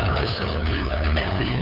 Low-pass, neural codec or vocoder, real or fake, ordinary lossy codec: 5.4 kHz; codec, 16 kHz, 2 kbps, FunCodec, trained on LibriTTS, 25 frames a second; fake; none